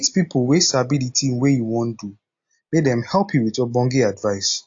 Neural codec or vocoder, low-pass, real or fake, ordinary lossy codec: none; 7.2 kHz; real; AAC, 48 kbps